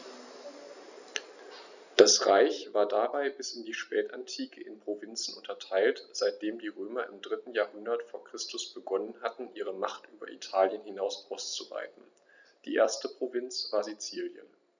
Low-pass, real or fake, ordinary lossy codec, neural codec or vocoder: 7.2 kHz; real; none; none